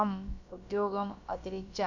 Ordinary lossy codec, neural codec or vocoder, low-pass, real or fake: none; codec, 16 kHz, about 1 kbps, DyCAST, with the encoder's durations; 7.2 kHz; fake